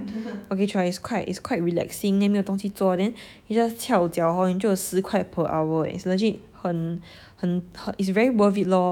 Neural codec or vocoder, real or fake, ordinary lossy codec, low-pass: autoencoder, 48 kHz, 128 numbers a frame, DAC-VAE, trained on Japanese speech; fake; none; 19.8 kHz